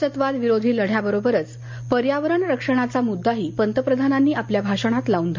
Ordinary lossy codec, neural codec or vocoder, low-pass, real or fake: MP3, 64 kbps; none; 7.2 kHz; real